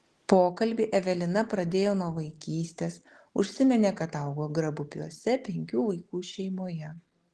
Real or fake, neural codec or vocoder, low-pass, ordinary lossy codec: real; none; 10.8 kHz; Opus, 16 kbps